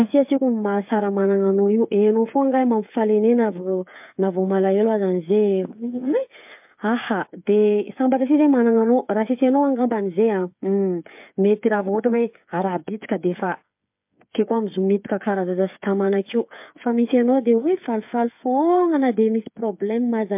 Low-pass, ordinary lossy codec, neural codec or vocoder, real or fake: 3.6 kHz; MP3, 32 kbps; codec, 16 kHz, 8 kbps, FreqCodec, smaller model; fake